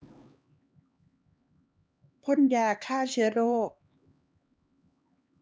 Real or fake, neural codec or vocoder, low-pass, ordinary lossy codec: fake; codec, 16 kHz, 2 kbps, X-Codec, HuBERT features, trained on LibriSpeech; none; none